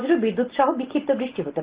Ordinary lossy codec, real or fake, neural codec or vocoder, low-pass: Opus, 16 kbps; real; none; 3.6 kHz